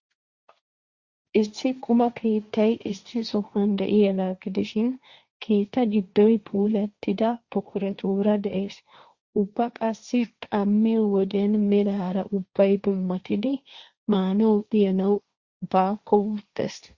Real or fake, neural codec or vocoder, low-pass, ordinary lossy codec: fake; codec, 16 kHz, 1.1 kbps, Voila-Tokenizer; 7.2 kHz; Opus, 64 kbps